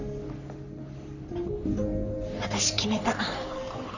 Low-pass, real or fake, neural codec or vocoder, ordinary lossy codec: 7.2 kHz; fake; codec, 44.1 kHz, 3.4 kbps, Pupu-Codec; none